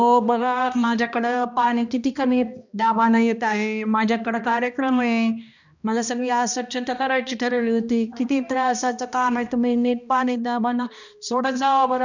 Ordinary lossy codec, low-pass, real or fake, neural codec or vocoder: none; 7.2 kHz; fake; codec, 16 kHz, 1 kbps, X-Codec, HuBERT features, trained on balanced general audio